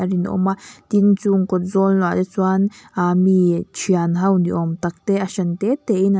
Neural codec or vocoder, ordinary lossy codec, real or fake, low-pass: none; none; real; none